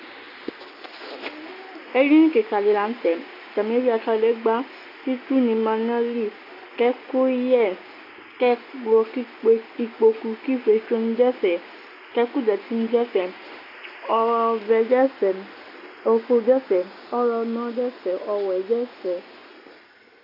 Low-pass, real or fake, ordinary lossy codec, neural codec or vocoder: 5.4 kHz; real; AAC, 32 kbps; none